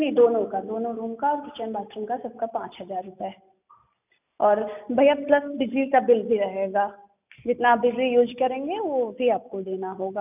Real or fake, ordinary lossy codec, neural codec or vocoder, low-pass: real; none; none; 3.6 kHz